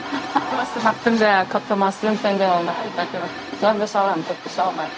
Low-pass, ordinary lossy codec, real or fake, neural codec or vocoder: none; none; fake; codec, 16 kHz, 0.4 kbps, LongCat-Audio-Codec